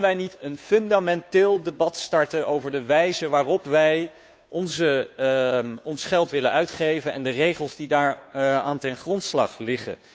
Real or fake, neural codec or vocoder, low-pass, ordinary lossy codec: fake; codec, 16 kHz, 2 kbps, FunCodec, trained on Chinese and English, 25 frames a second; none; none